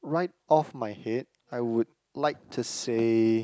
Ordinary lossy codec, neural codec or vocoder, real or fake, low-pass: none; none; real; none